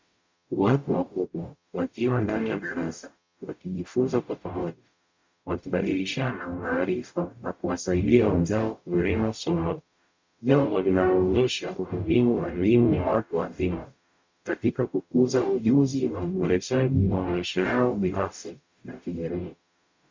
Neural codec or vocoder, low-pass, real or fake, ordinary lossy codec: codec, 44.1 kHz, 0.9 kbps, DAC; 7.2 kHz; fake; MP3, 64 kbps